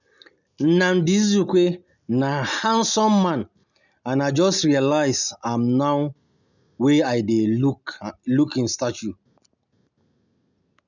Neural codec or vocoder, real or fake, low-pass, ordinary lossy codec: none; real; 7.2 kHz; none